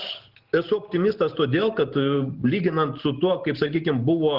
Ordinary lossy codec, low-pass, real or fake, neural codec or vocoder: Opus, 16 kbps; 5.4 kHz; real; none